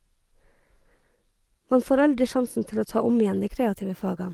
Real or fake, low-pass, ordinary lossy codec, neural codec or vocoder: fake; 19.8 kHz; Opus, 24 kbps; codec, 44.1 kHz, 7.8 kbps, DAC